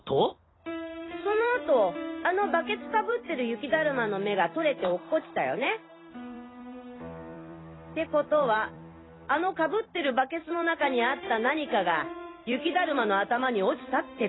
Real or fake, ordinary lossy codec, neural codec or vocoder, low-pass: fake; AAC, 16 kbps; vocoder, 44.1 kHz, 128 mel bands every 256 samples, BigVGAN v2; 7.2 kHz